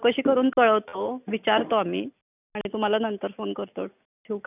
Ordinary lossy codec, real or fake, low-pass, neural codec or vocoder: none; real; 3.6 kHz; none